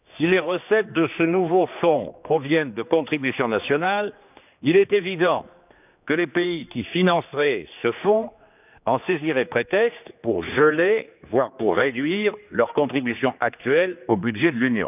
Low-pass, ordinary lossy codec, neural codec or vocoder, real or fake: 3.6 kHz; none; codec, 16 kHz, 2 kbps, X-Codec, HuBERT features, trained on general audio; fake